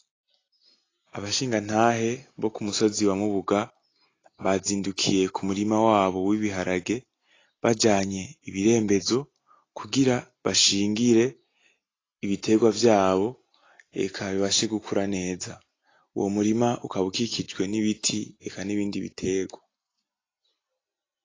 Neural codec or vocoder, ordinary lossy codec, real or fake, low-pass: none; AAC, 32 kbps; real; 7.2 kHz